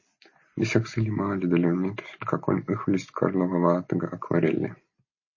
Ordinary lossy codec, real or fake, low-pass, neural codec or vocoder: MP3, 32 kbps; real; 7.2 kHz; none